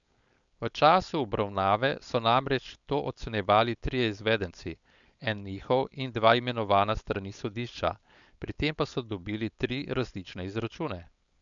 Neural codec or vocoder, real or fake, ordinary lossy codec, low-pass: codec, 16 kHz, 4.8 kbps, FACodec; fake; none; 7.2 kHz